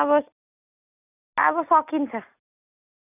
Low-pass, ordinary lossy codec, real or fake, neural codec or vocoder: 3.6 kHz; none; real; none